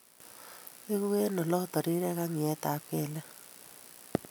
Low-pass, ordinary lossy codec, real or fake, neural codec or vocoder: none; none; real; none